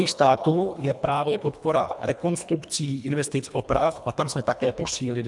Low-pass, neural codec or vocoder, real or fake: 10.8 kHz; codec, 24 kHz, 1.5 kbps, HILCodec; fake